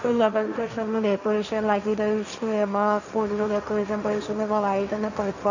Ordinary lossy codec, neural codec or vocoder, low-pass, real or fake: none; codec, 16 kHz, 1.1 kbps, Voila-Tokenizer; 7.2 kHz; fake